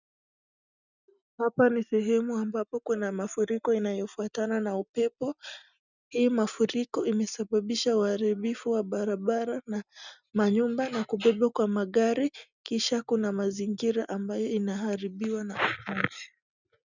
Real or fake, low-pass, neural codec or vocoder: real; 7.2 kHz; none